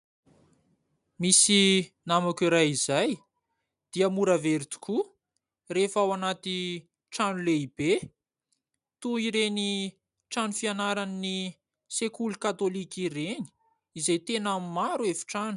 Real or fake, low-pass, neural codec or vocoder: real; 10.8 kHz; none